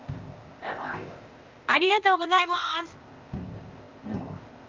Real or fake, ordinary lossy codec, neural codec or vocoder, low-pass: fake; Opus, 24 kbps; codec, 16 kHz, 0.8 kbps, ZipCodec; 7.2 kHz